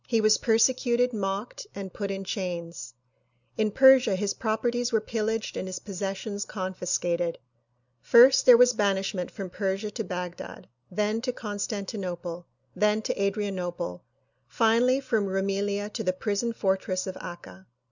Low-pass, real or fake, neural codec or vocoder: 7.2 kHz; real; none